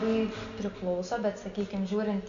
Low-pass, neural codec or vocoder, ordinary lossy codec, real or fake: 7.2 kHz; none; MP3, 64 kbps; real